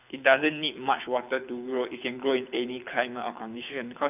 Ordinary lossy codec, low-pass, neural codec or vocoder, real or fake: none; 3.6 kHz; codec, 24 kHz, 6 kbps, HILCodec; fake